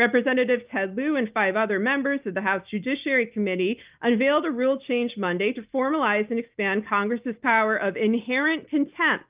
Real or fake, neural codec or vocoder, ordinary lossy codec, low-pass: real; none; Opus, 24 kbps; 3.6 kHz